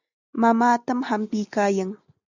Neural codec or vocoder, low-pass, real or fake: none; 7.2 kHz; real